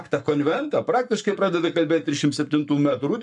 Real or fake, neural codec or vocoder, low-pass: fake; codec, 44.1 kHz, 7.8 kbps, Pupu-Codec; 10.8 kHz